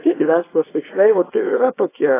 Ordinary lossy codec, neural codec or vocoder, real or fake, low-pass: AAC, 16 kbps; codec, 24 kHz, 0.9 kbps, WavTokenizer, small release; fake; 3.6 kHz